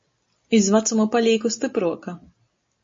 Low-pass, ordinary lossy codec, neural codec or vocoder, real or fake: 7.2 kHz; MP3, 32 kbps; none; real